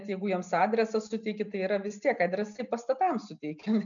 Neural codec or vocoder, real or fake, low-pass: none; real; 7.2 kHz